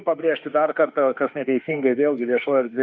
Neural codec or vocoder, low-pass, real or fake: autoencoder, 48 kHz, 32 numbers a frame, DAC-VAE, trained on Japanese speech; 7.2 kHz; fake